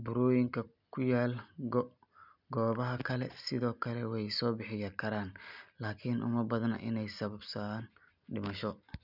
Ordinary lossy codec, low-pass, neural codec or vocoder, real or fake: none; 5.4 kHz; none; real